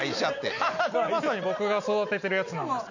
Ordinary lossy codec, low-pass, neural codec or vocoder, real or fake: none; 7.2 kHz; none; real